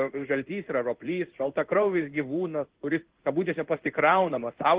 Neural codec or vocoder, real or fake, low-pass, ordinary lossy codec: codec, 16 kHz in and 24 kHz out, 1 kbps, XY-Tokenizer; fake; 3.6 kHz; Opus, 16 kbps